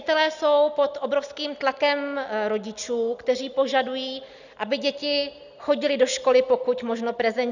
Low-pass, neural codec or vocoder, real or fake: 7.2 kHz; none; real